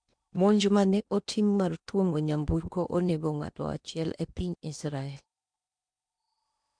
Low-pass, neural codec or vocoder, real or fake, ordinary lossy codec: 9.9 kHz; codec, 16 kHz in and 24 kHz out, 0.8 kbps, FocalCodec, streaming, 65536 codes; fake; none